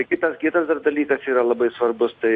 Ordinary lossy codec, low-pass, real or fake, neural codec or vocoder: AAC, 48 kbps; 9.9 kHz; real; none